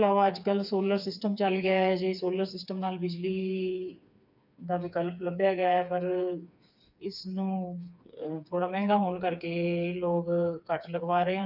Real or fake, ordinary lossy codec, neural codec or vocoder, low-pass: fake; none; codec, 16 kHz, 4 kbps, FreqCodec, smaller model; 5.4 kHz